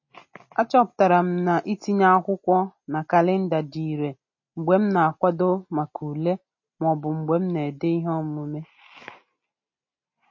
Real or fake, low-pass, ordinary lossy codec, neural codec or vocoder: real; 7.2 kHz; MP3, 32 kbps; none